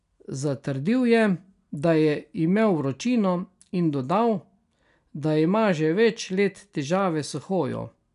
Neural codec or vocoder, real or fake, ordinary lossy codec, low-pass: none; real; none; 10.8 kHz